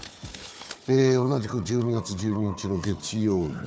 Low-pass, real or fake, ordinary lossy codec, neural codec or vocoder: none; fake; none; codec, 16 kHz, 4 kbps, FunCodec, trained on Chinese and English, 50 frames a second